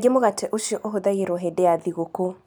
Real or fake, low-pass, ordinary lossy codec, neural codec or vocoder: real; none; none; none